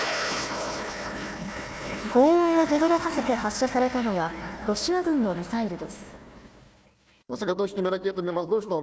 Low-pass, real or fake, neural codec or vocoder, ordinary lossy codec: none; fake; codec, 16 kHz, 1 kbps, FunCodec, trained on Chinese and English, 50 frames a second; none